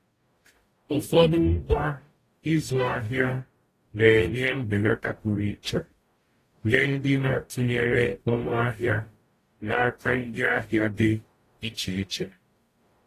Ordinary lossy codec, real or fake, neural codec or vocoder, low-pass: AAC, 48 kbps; fake; codec, 44.1 kHz, 0.9 kbps, DAC; 14.4 kHz